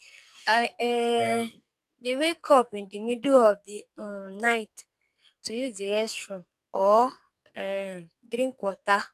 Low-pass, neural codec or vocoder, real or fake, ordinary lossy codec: 14.4 kHz; codec, 44.1 kHz, 2.6 kbps, SNAC; fake; AAC, 96 kbps